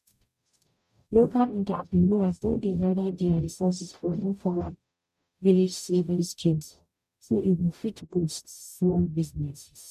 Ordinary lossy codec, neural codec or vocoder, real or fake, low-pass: none; codec, 44.1 kHz, 0.9 kbps, DAC; fake; 14.4 kHz